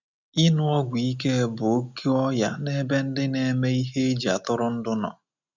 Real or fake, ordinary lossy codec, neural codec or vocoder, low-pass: real; none; none; 7.2 kHz